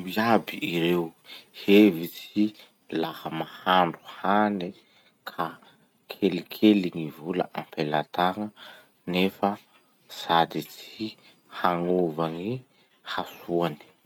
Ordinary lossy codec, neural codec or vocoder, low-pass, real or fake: none; none; 19.8 kHz; real